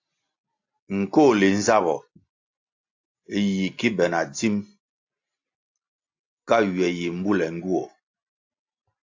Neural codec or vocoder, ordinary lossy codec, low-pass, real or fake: none; AAC, 48 kbps; 7.2 kHz; real